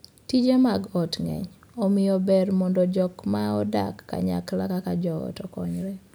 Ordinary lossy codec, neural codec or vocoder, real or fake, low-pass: none; none; real; none